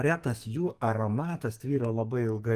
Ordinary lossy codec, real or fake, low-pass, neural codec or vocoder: Opus, 32 kbps; fake; 14.4 kHz; codec, 44.1 kHz, 2.6 kbps, SNAC